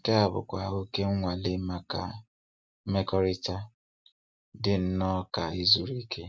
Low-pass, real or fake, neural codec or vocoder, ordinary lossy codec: none; real; none; none